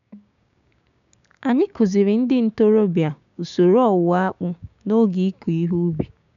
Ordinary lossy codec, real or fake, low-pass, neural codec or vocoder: none; fake; 7.2 kHz; codec, 16 kHz, 6 kbps, DAC